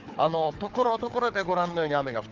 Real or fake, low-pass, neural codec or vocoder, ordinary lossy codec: fake; 7.2 kHz; codec, 16 kHz, 4 kbps, FunCodec, trained on Chinese and English, 50 frames a second; Opus, 16 kbps